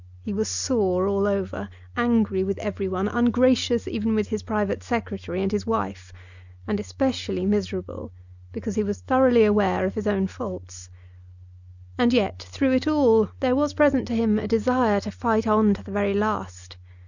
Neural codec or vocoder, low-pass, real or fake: none; 7.2 kHz; real